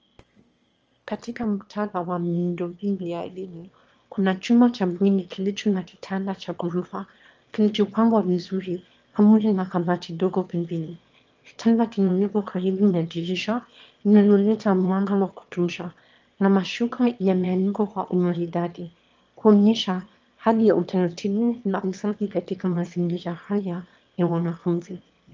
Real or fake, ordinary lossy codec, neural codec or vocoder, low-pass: fake; Opus, 24 kbps; autoencoder, 22.05 kHz, a latent of 192 numbers a frame, VITS, trained on one speaker; 7.2 kHz